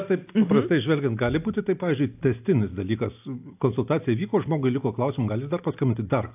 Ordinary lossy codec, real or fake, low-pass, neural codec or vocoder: AAC, 32 kbps; real; 3.6 kHz; none